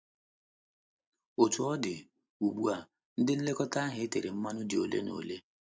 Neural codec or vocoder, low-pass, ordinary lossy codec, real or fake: none; none; none; real